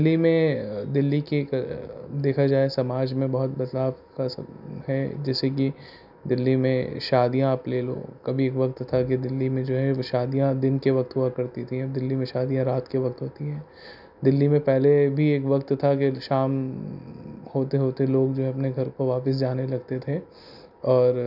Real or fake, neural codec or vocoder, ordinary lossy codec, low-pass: real; none; none; 5.4 kHz